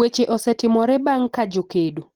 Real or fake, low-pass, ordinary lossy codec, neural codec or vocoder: real; 19.8 kHz; Opus, 16 kbps; none